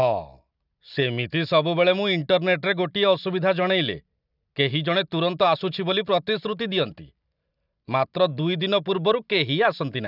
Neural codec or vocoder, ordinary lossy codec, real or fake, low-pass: none; none; real; 5.4 kHz